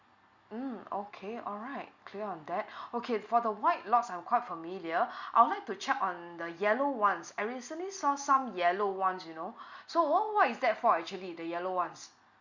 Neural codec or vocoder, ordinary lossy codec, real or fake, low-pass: none; Opus, 64 kbps; real; 7.2 kHz